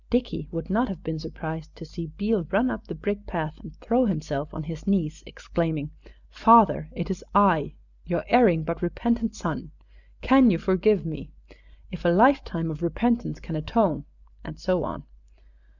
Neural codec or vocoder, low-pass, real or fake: none; 7.2 kHz; real